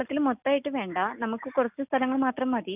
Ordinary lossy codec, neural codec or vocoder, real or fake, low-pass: none; none; real; 3.6 kHz